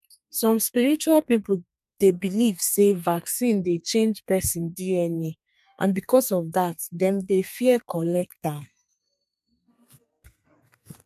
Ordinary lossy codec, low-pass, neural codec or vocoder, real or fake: MP3, 96 kbps; 14.4 kHz; codec, 32 kHz, 1.9 kbps, SNAC; fake